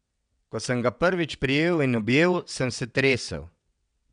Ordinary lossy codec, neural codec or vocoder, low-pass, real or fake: none; vocoder, 22.05 kHz, 80 mel bands, WaveNeXt; 9.9 kHz; fake